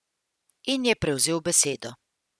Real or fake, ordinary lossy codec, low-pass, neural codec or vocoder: real; none; none; none